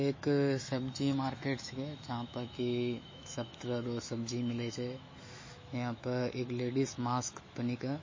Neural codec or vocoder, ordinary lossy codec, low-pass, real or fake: autoencoder, 48 kHz, 128 numbers a frame, DAC-VAE, trained on Japanese speech; MP3, 32 kbps; 7.2 kHz; fake